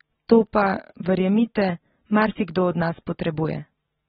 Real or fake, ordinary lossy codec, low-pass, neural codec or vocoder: real; AAC, 16 kbps; 7.2 kHz; none